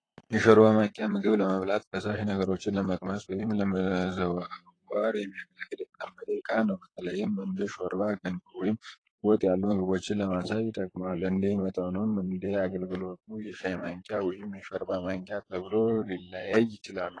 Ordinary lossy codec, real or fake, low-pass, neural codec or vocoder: AAC, 32 kbps; fake; 9.9 kHz; vocoder, 44.1 kHz, 128 mel bands, Pupu-Vocoder